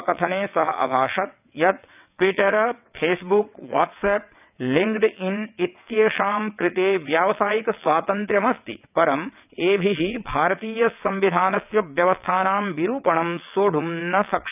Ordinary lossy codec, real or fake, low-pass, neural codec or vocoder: none; fake; 3.6 kHz; vocoder, 22.05 kHz, 80 mel bands, WaveNeXt